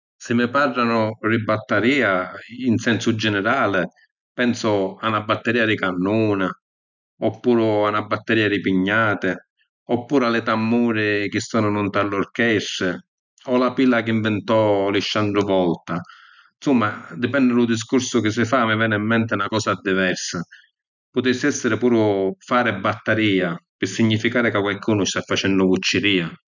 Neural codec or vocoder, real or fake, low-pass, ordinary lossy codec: none; real; 7.2 kHz; none